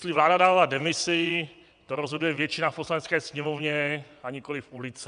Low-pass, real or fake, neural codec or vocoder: 9.9 kHz; fake; vocoder, 22.05 kHz, 80 mel bands, WaveNeXt